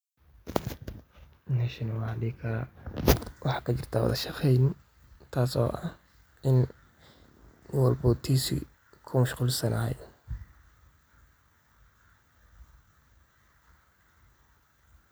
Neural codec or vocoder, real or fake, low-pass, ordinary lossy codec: none; real; none; none